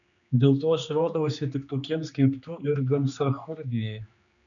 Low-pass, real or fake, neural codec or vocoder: 7.2 kHz; fake; codec, 16 kHz, 2 kbps, X-Codec, HuBERT features, trained on general audio